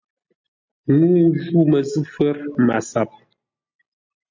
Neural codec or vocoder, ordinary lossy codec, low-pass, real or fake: none; MP3, 48 kbps; 7.2 kHz; real